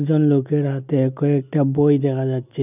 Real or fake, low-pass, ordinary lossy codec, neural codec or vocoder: real; 3.6 kHz; none; none